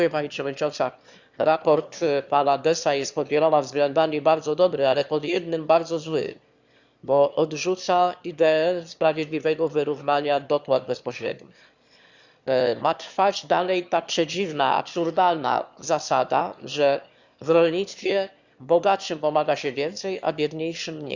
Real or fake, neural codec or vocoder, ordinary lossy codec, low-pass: fake; autoencoder, 22.05 kHz, a latent of 192 numbers a frame, VITS, trained on one speaker; Opus, 64 kbps; 7.2 kHz